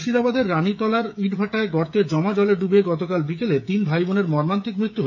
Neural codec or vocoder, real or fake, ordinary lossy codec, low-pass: codec, 16 kHz, 16 kbps, FreqCodec, smaller model; fake; none; 7.2 kHz